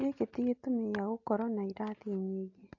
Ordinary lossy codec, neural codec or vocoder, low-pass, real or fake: AAC, 48 kbps; none; 7.2 kHz; real